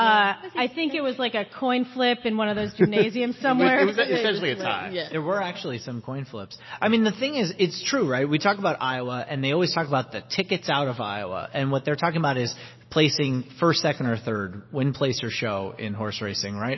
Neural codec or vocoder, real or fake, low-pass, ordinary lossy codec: none; real; 7.2 kHz; MP3, 24 kbps